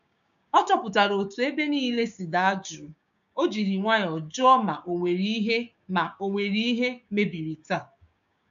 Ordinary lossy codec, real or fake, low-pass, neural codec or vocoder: AAC, 96 kbps; fake; 7.2 kHz; codec, 16 kHz, 6 kbps, DAC